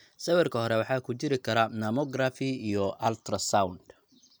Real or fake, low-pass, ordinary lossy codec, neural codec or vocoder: real; none; none; none